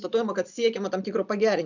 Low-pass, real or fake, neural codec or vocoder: 7.2 kHz; real; none